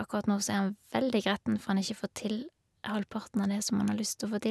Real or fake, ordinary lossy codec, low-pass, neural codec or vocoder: real; none; none; none